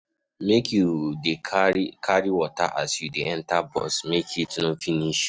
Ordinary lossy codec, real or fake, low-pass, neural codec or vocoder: none; real; none; none